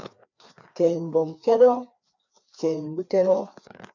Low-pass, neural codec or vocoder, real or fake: 7.2 kHz; codec, 16 kHz, 2 kbps, FreqCodec, larger model; fake